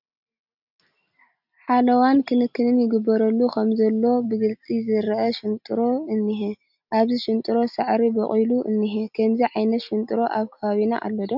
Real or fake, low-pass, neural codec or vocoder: real; 5.4 kHz; none